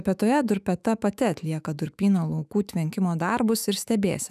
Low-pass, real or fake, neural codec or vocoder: 14.4 kHz; fake; autoencoder, 48 kHz, 128 numbers a frame, DAC-VAE, trained on Japanese speech